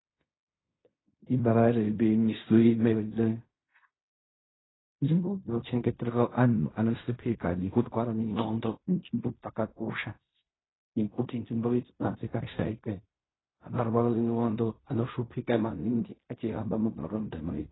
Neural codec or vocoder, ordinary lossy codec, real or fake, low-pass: codec, 16 kHz in and 24 kHz out, 0.4 kbps, LongCat-Audio-Codec, fine tuned four codebook decoder; AAC, 16 kbps; fake; 7.2 kHz